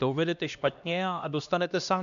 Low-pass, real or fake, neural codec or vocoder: 7.2 kHz; fake; codec, 16 kHz, 1 kbps, X-Codec, HuBERT features, trained on LibriSpeech